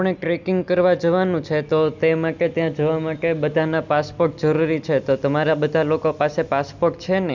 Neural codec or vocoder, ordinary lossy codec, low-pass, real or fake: none; none; 7.2 kHz; real